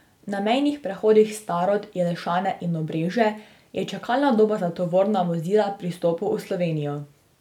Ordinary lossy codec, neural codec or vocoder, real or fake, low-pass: none; none; real; 19.8 kHz